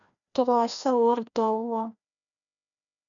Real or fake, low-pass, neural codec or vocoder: fake; 7.2 kHz; codec, 16 kHz, 1 kbps, FreqCodec, larger model